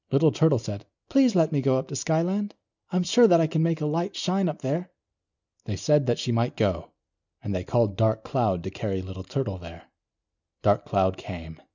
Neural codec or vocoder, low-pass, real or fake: none; 7.2 kHz; real